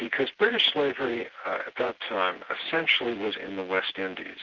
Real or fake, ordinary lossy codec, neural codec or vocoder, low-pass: fake; Opus, 16 kbps; vocoder, 24 kHz, 100 mel bands, Vocos; 7.2 kHz